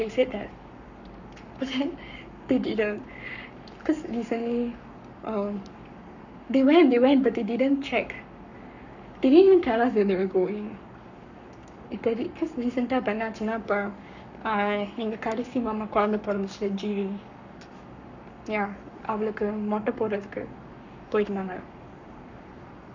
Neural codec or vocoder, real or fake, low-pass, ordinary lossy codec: codec, 44.1 kHz, 7.8 kbps, Pupu-Codec; fake; 7.2 kHz; none